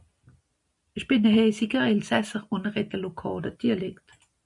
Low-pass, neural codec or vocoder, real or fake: 10.8 kHz; none; real